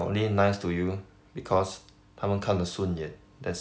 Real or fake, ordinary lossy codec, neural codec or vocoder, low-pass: real; none; none; none